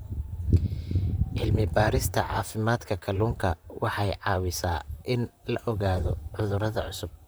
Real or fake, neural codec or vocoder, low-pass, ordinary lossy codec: fake; vocoder, 44.1 kHz, 128 mel bands, Pupu-Vocoder; none; none